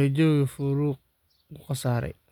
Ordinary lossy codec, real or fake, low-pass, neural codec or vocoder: none; real; 19.8 kHz; none